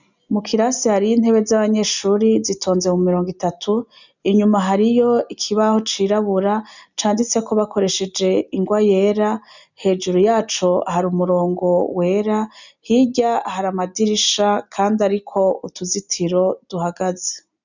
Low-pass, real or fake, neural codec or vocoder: 7.2 kHz; real; none